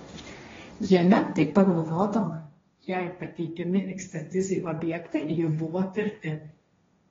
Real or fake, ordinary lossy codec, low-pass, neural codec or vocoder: fake; AAC, 24 kbps; 7.2 kHz; codec, 16 kHz, 1.1 kbps, Voila-Tokenizer